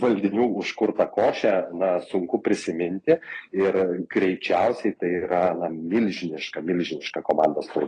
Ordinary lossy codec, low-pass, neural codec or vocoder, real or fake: AAC, 32 kbps; 9.9 kHz; none; real